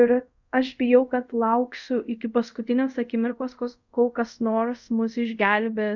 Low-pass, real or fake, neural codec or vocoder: 7.2 kHz; fake; codec, 24 kHz, 0.5 kbps, DualCodec